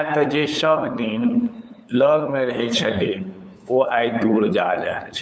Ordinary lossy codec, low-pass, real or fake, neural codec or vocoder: none; none; fake; codec, 16 kHz, 8 kbps, FunCodec, trained on LibriTTS, 25 frames a second